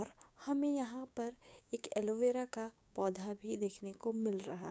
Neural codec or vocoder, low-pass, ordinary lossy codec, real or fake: codec, 16 kHz, 6 kbps, DAC; none; none; fake